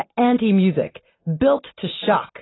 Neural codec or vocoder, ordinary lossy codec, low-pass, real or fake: none; AAC, 16 kbps; 7.2 kHz; real